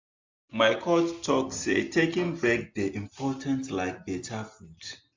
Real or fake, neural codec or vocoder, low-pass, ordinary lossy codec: real; none; 7.2 kHz; MP3, 64 kbps